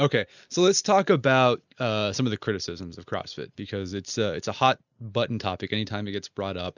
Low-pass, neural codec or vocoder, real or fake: 7.2 kHz; none; real